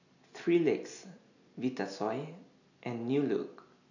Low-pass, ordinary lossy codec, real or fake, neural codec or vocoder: 7.2 kHz; none; real; none